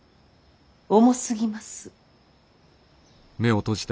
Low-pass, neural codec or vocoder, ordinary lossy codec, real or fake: none; none; none; real